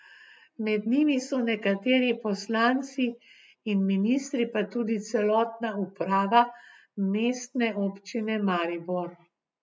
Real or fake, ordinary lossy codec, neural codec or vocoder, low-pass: real; none; none; none